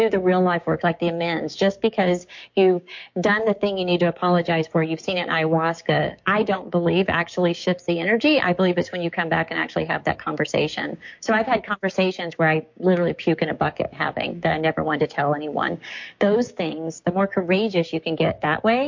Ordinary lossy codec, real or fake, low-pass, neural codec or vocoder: MP3, 48 kbps; fake; 7.2 kHz; codec, 16 kHz, 6 kbps, DAC